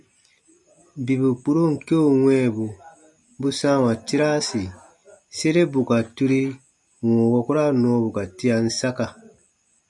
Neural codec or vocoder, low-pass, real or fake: none; 10.8 kHz; real